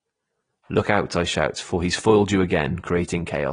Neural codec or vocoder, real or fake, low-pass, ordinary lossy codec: none; real; 9.9 kHz; AAC, 32 kbps